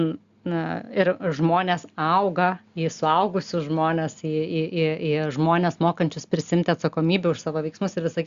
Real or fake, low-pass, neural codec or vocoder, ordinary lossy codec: real; 7.2 kHz; none; Opus, 64 kbps